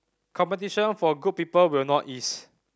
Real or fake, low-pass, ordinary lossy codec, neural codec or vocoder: real; none; none; none